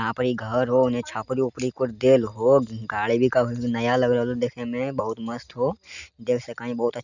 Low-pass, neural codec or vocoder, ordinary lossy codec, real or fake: 7.2 kHz; none; none; real